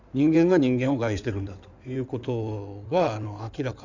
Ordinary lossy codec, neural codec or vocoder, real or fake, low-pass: none; codec, 16 kHz in and 24 kHz out, 2.2 kbps, FireRedTTS-2 codec; fake; 7.2 kHz